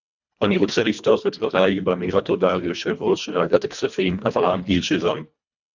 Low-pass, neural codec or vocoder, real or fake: 7.2 kHz; codec, 24 kHz, 1.5 kbps, HILCodec; fake